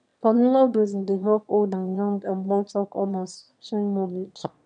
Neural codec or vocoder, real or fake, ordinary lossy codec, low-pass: autoencoder, 22.05 kHz, a latent of 192 numbers a frame, VITS, trained on one speaker; fake; none; 9.9 kHz